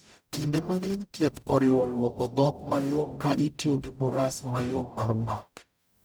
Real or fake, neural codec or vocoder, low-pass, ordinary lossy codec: fake; codec, 44.1 kHz, 0.9 kbps, DAC; none; none